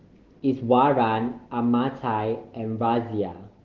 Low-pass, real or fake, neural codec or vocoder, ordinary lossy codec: 7.2 kHz; real; none; Opus, 16 kbps